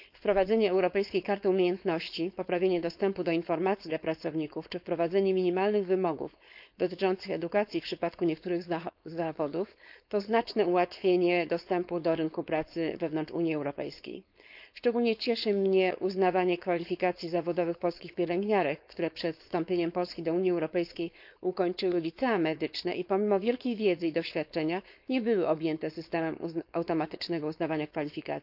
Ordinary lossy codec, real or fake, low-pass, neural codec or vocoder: none; fake; 5.4 kHz; codec, 16 kHz, 4.8 kbps, FACodec